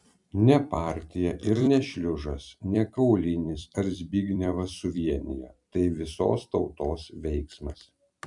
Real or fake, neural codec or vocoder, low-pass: fake; vocoder, 44.1 kHz, 128 mel bands every 256 samples, BigVGAN v2; 10.8 kHz